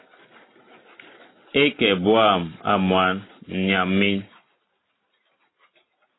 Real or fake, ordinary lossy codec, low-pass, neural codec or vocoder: real; AAC, 16 kbps; 7.2 kHz; none